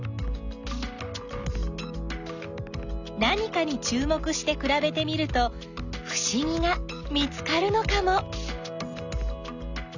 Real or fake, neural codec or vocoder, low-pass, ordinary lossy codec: real; none; 7.2 kHz; none